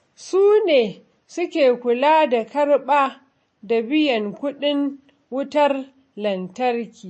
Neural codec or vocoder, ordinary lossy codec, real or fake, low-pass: none; MP3, 32 kbps; real; 9.9 kHz